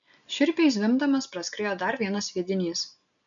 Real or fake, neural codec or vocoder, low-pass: real; none; 7.2 kHz